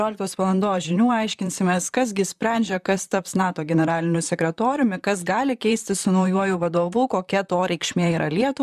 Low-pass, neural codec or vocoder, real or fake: 14.4 kHz; vocoder, 44.1 kHz, 128 mel bands, Pupu-Vocoder; fake